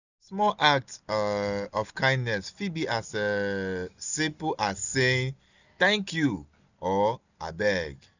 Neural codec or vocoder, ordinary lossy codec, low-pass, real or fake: none; none; 7.2 kHz; real